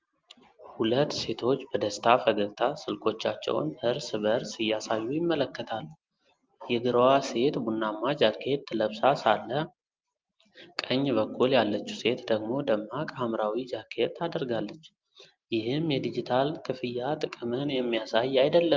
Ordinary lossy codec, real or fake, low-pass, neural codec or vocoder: Opus, 32 kbps; real; 7.2 kHz; none